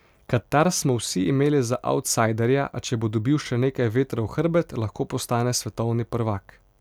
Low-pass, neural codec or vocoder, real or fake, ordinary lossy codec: 19.8 kHz; none; real; none